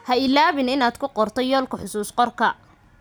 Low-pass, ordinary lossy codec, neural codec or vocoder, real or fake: none; none; none; real